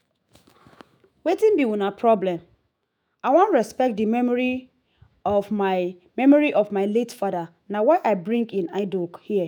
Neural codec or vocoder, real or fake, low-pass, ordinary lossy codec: autoencoder, 48 kHz, 128 numbers a frame, DAC-VAE, trained on Japanese speech; fake; none; none